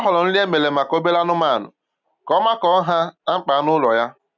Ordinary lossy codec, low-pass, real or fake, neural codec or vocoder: none; 7.2 kHz; real; none